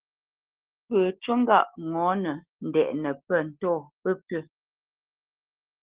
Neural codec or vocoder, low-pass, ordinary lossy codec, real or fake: none; 3.6 kHz; Opus, 16 kbps; real